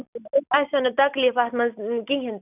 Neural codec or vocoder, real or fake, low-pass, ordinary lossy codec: none; real; 3.6 kHz; none